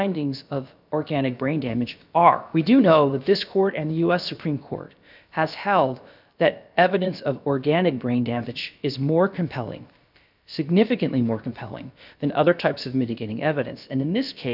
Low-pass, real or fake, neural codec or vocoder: 5.4 kHz; fake; codec, 16 kHz, about 1 kbps, DyCAST, with the encoder's durations